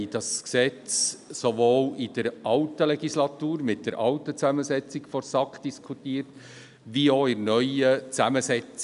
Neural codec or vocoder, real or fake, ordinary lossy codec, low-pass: none; real; none; 10.8 kHz